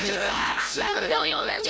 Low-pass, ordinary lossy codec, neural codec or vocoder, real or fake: none; none; codec, 16 kHz, 0.5 kbps, FreqCodec, larger model; fake